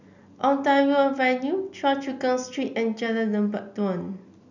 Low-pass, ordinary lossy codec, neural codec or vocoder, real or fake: 7.2 kHz; none; none; real